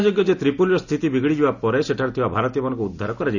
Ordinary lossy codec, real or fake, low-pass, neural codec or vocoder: none; real; 7.2 kHz; none